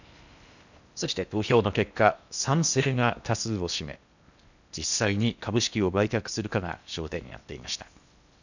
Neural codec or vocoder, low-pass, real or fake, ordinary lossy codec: codec, 16 kHz in and 24 kHz out, 0.8 kbps, FocalCodec, streaming, 65536 codes; 7.2 kHz; fake; none